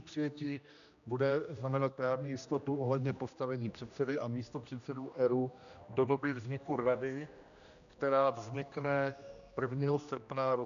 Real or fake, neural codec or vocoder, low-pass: fake; codec, 16 kHz, 1 kbps, X-Codec, HuBERT features, trained on general audio; 7.2 kHz